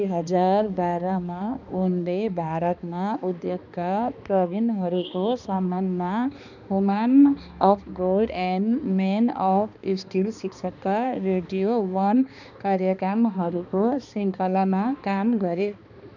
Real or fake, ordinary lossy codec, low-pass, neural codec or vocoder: fake; none; 7.2 kHz; codec, 16 kHz, 2 kbps, X-Codec, HuBERT features, trained on balanced general audio